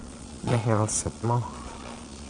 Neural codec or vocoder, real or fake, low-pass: vocoder, 22.05 kHz, 80 mel bands, Vocos; fake; 9.9 kHz